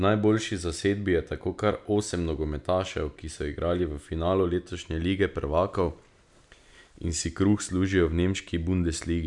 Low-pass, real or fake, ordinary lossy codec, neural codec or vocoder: 10.8 kHz; real; none; none